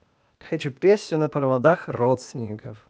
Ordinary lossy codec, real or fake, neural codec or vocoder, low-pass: none; fake; codec, 16 kHz, 0.8 kbps, ZipCodec; none